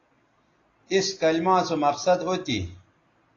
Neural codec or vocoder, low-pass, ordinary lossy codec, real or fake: none; 7.2 kHz; AAC, 32 kbps; real